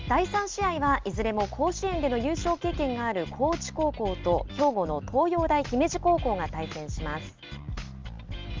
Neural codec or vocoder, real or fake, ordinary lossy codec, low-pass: none; real; Opus, 32 kbps; 7.2 kHz